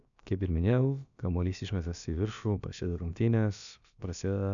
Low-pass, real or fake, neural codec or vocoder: 7.2 kHz; fake; codec, 16 kHz, about 1 kbps, DyCAST, with the encoder's durations